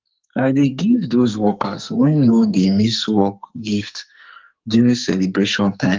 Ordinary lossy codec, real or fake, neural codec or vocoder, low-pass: Opus, 32 kbps; fake; codec, 44.1 kHz, 2.6 kbps, SNAC; 7.2 kHz